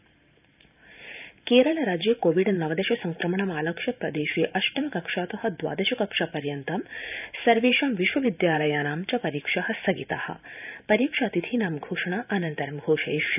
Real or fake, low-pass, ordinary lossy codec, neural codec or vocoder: fake; 3.6 kHz; none; vocoder, 44.1 kHz, 128 mel bands every 512 samples, BigVGAN v2